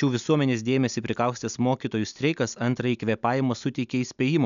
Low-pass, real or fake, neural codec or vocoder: 7.2 kHz; real; none